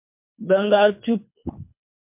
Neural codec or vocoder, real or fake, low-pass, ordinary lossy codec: codec, 24 kHz, 3 kbps, HILCodec; fake; 3.6 kHz; MP3, 32 kbps